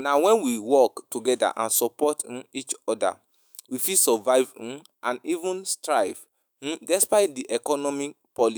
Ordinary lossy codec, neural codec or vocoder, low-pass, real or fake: none; autoencoder, 48 kHz, 128 numbers a frame, DAC-VAE, trained on Japanese speech; none; fake